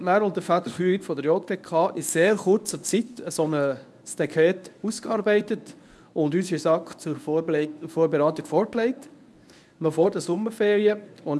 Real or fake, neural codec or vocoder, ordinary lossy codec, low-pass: fake; codec, 24 kHz, 0.9 kbps, WavTokenizer, medium speech release version 1; none; none